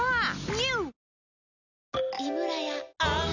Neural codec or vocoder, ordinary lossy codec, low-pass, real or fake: none; none; 7.2 kHz; real